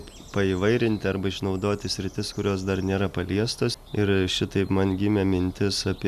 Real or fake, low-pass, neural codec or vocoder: real; 14.4 kHz; none